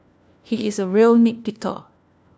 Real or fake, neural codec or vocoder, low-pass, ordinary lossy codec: fake; codec, 16 kHz, 1 kbps, FunCodec, trained on LibriTTS, 50 frames a second; none; none